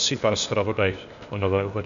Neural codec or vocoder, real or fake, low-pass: codec, 16 kHz, 0.8 kbps, ZipCodec; fake; 7.2 kHz